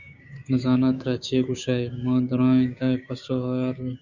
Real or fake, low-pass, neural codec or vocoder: fake; 7.2 kHz; codec, 16 kHz, 6 kbps, DAC